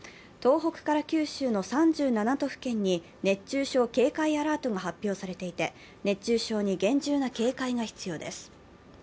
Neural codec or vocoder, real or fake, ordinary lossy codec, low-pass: none; real; none; none